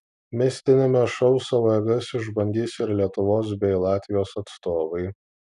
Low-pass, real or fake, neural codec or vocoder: 10.8 kHz; real; none